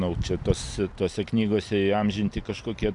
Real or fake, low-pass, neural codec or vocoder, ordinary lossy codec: real; 10.8 kHz; none; AAC, 64 kbps